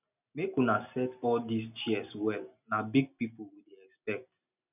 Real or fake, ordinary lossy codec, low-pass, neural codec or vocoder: real; none; 3.6 kHz; none